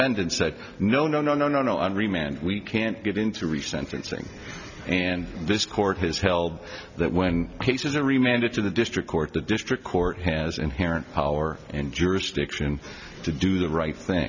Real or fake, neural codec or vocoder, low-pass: real; none; 7.2 kHz